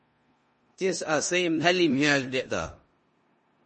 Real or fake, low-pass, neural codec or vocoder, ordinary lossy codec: fake; 10.8 kHz; codec, 16 kHz in and 24 kHz out, 0.9 kbps, LongCat-Audio-Codec, four codebook decoder; MP3, 32 kbps